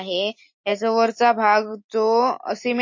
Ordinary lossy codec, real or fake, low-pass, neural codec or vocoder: MP3, 32 kbps; real; 7.2 kHz; none